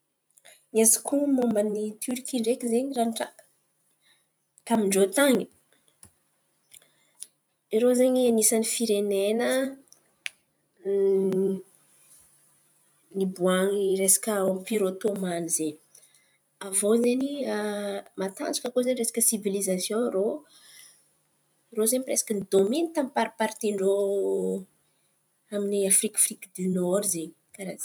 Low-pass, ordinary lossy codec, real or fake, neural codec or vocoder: none; none; fake; vocoder, 44.1 kHz, 128 mel bands every 512 samples, BigVGAN v2